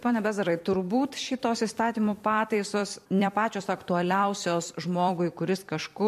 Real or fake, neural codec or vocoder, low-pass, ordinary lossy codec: fake; vocoder, 44.1 kHz, 128 mel bands every 256 samples, BigVGAN v2; 14.4 kHz; MP3, 64 kbps